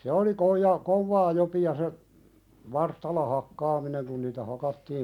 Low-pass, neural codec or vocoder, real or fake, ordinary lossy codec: 19.8 kHz; none; real; none